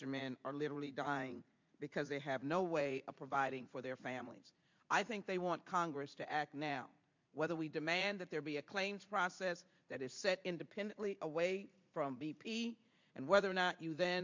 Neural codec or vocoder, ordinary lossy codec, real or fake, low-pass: vocoder, 44.1 kHz, 80 mel bands, Vocos; MP3, 64 kbps; fake; 7.2 kHz